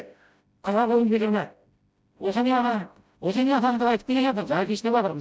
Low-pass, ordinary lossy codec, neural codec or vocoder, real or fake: none; none; codec, 16 kHz, 0.5 kbps, FreqCodec, smaller model; fake